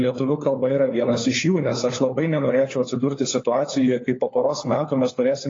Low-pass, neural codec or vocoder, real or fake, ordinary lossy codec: 7.2 kHz; codec, 16 kHz, 4 kbps, FunCodec, trained on LibriTTS, 50 frames a second; fake; AAC, 32 kbps